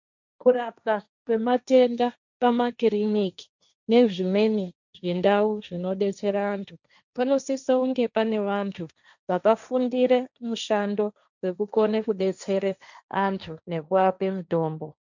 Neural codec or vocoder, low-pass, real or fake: codec, 16 kHz, 1.1 kbps, Voila-Tokenizer; 7.2 kHz; fake